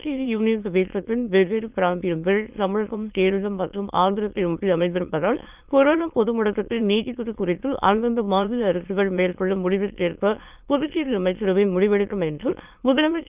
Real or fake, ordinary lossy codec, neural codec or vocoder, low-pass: fake; Opus, 24 kbps; autoencoder, 22.05 kHz, a latent of 192 numbers a frame, VITS, trained on many speakers; 3.6 kHz